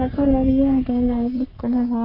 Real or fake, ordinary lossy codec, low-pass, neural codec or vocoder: fake; MP3, 32 kbps; 5.4 kHz; codec, 44.1 kHz, 3.4 kbps, Pupu-Codec